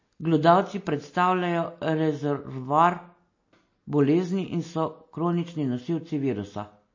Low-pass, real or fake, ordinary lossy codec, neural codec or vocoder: 7.2 kHz; real; MP3, 32 kbps; none